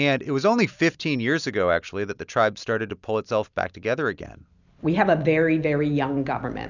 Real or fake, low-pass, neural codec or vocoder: real; 7.2 kHz; none